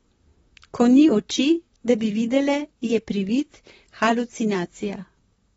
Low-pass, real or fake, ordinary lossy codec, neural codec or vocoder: 19.8 kHz; fake; AAC, 24 kbps; vocoder, 44.1 kHz, 128 mel bands, Pupu-Vocoder